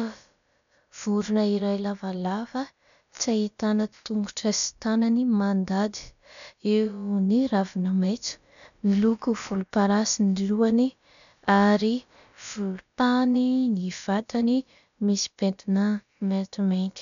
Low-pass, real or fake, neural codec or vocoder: 7.2 kHz; fake; codec, 16 kHz, about 1 kbps, DyCAST, with the encoder's durations